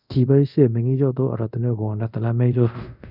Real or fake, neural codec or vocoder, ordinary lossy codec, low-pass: fake; codec, 24 kHz, 0.5 kbps, DualCodec; none; 5.4 kHz